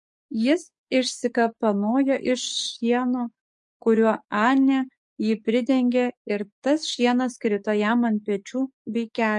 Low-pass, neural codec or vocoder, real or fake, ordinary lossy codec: 10.8 kHz; codec, 44.1 kHz, 7.8 kbps, DAC; fake; MP3, 48 kbps